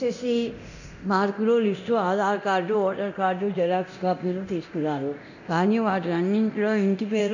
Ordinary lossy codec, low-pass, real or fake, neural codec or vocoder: none; 7.2 kHz; fake; codec, 24 kHz, 0.9 kbps, DualCodec